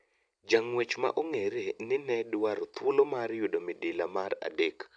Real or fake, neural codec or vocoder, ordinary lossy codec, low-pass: real; none; none; 9.9 kHz